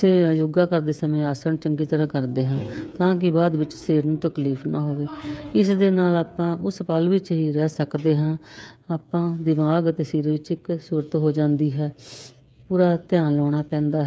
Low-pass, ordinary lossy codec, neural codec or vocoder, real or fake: none; none; codec, 16 kHz, 8 kbps, FreqCodec, smaller model; fake